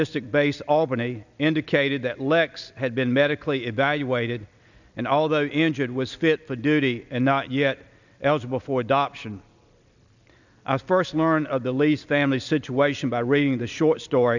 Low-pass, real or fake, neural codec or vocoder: 7.2 kHz; real; none